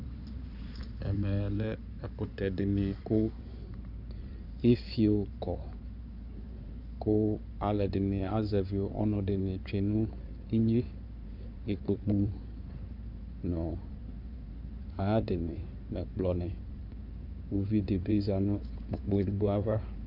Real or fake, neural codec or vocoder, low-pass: fake; codec, 16 kHz in and 24 kHz out, 2.2 kbps, FireRedTTS-2 codec; 5.4 kHz